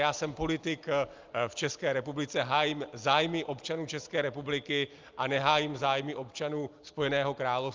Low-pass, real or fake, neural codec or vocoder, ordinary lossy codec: 7.2 kHz; real; none; Opus, 32 kbps